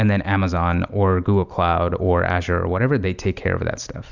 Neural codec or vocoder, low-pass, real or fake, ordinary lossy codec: none; 7.2 kHz; real; Opus, 64 kbps